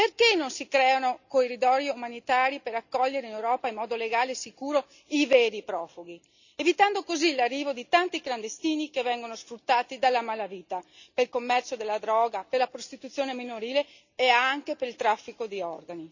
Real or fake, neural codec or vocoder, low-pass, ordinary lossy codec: real; none; 7.2 kHz; none